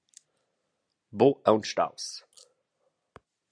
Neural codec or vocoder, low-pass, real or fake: none; 9.9 kHz; real